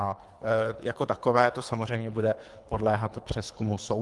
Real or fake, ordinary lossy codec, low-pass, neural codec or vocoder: fake; Opus, 24 kbps; 10.8 kHz; codec, 24 kHz, 3 kbps, HILCodec